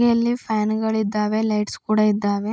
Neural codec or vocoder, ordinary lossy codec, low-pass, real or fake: none; none; none; real